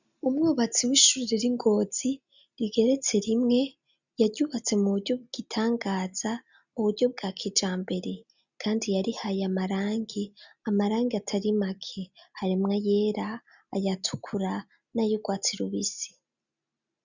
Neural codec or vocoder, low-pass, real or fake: none; 7.2 kHz; real